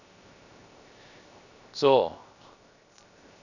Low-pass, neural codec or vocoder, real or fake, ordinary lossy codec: 7.2 kHz; codec, 16 kHz, 0.7 kbps, FocalCodec; fake; none